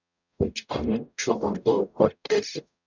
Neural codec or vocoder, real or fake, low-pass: codec, 44.1 kHz, 0.9 kbps, DAC; fake; 7.2 kHz